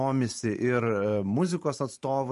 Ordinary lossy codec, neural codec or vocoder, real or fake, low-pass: MP3, 48 kbps; vocoder, 44.1 kHz, 128 mel bands every 512 samples, BigVGAN v2; fake; 14.4 kHz